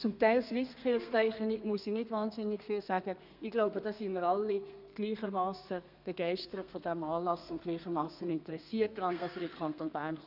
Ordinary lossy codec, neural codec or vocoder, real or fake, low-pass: none; codec, 32 kHz, 1.9 kbps, SNAC; fake; 5.4 kHz